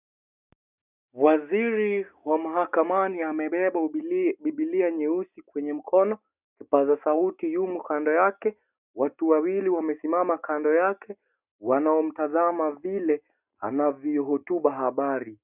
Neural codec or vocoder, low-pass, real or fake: none; 3.6 kHz; real